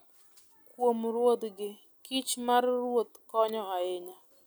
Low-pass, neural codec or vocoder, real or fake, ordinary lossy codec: none; none; real; none